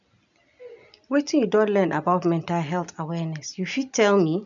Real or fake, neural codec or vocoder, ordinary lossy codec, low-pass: real; none; none; 7.2 kHz